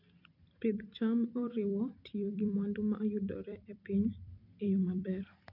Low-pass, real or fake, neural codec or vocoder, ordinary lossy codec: 5.4 kHz; fake; vocoder, 44.1 kHz, 128 mel bands every 256 samples, BigVGAN v2; none